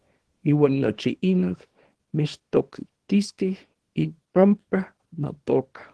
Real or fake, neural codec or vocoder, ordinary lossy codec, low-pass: fake; codec, 24 kHz, 0.9 kbps, WavTokenizer, small release; Opus, 16 kbps; 10.8 kHz